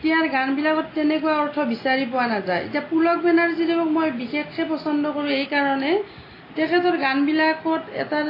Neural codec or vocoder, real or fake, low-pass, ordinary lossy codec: none; real; 5.4 kHz; AAC, 24 kbps